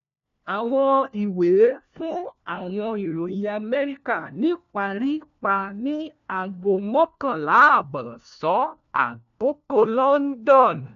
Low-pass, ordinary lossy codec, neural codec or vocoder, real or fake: 7.2 kHz; none; codec, 16 kHz, 1 kbps, FunCodec, trained on LibriTTS, 50 frames a second; fake